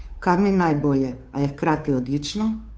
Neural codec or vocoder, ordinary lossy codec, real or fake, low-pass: codec, 16 kHz, 2 kbps, FunCodec, trained on Chinese and English, 25 frames a second; none; fake; none